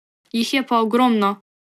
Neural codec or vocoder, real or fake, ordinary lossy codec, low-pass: none; real; none; 14.4 kHz